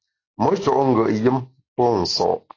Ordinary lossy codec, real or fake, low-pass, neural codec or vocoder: AAC, 32 kbps; real; 7.2 kHz; none